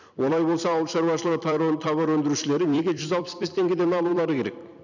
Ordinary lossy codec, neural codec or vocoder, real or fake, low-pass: none; vocoder, 44.1 kHz, 128 mel bands every 256 samples, BigVGAN v2; fake; 7.2 kHz